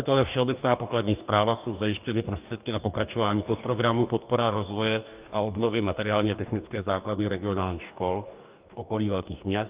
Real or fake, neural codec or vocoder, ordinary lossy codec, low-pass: fake; codec, 44.1 kHz, 2.6 kbps, DAC; Opus, 32 kbps; 3.6 kHz